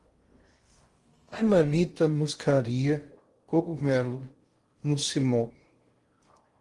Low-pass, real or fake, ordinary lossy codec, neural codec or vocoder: 10.8 kHz; fake; Opus, 24 kbps; codec, 16 kHz in and 24 kHz out, 0.6 kbps, FocalCodec, streaming, 2048 codes